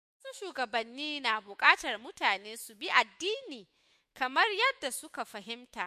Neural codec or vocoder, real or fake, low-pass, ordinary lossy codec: autoencoder, 48 kHz, 128 numbers a frame, DAC-VAE, trained on Japanese speech; fake; 14.4 kHz; MP3, 64 kbps